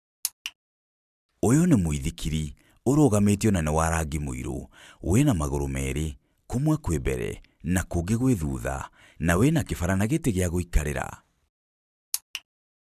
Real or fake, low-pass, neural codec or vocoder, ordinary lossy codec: real; 14.4 kHz; none; none